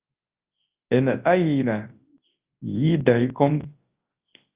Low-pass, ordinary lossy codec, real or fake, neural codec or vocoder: 3.6 kHz; Opus, 32 kbps; fake; codec, 24 kHz, 0.9 kbps, WavTokenizer, large speech release